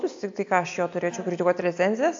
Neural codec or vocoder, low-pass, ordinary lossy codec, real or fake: none; 7.2 kHz; AAC, 48 kbps; real